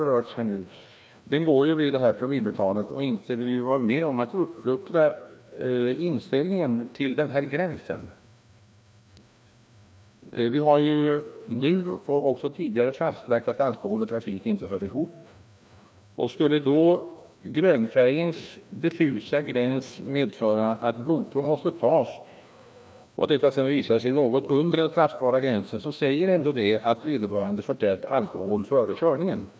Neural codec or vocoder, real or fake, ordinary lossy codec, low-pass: codec, 16 kHz, 1 kbps, FreqCodec, larger model; fake; none; none